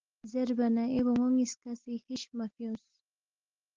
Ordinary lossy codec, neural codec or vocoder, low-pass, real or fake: Opus, 16 kbps; none; 7.2 kHz; real